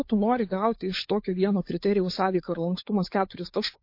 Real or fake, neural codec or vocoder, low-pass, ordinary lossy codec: fake; codec, 16 kHz, 4 kbps, FunCodec, trained on LibriTTS, 50 frames a second; 5.4 kHz; MP3, 32 kbps